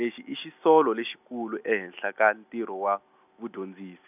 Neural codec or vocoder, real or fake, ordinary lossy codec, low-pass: none; real; none; 3.6 kHz